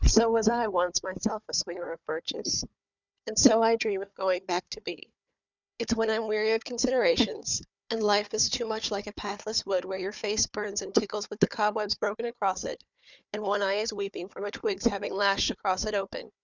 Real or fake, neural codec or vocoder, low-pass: fake; codec, 16 kHz, 4 kbps, FunCodec, trained on Chinese and English, 50 frames a second; 7.2 kHz